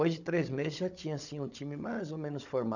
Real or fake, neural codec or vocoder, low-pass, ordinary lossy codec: fake; codec, 16 kHz, 16 kbps, FunCodec, trained on Chinese and English, 50 frames a second; 7.2 kHz; none